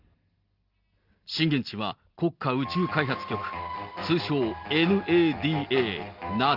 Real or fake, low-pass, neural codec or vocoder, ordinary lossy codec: real; 5.4 kHz; none; Opus, 32 kbps